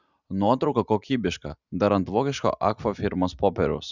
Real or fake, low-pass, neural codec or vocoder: real; 7.2 kHz; none